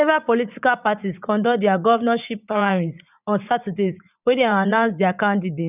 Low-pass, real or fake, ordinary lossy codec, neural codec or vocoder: 3.6 kHz; fake; none; vocoder, 44.1 kHz, 80 mel bands, Vocos